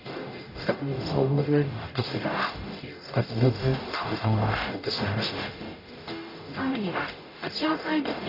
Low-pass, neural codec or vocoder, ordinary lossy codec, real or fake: 5.4 kHz; codec, 44.1 kHz, 0.9 kbps, DAC; none; fake